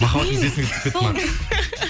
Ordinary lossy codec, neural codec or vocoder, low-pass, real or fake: none; none; none; real